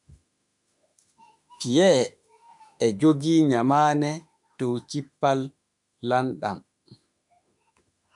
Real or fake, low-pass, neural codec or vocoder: fake; 10.8 kHz; autoencoder, 48 kHz, 32 numbers a frame, DAC-VAE, trained on Japanese speech